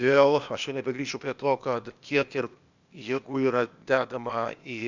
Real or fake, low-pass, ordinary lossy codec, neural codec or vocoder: fake; 7.2 kHz; Opus, 64 kbps; codec, 16 kHz, 0.8 kbps, ZipCodec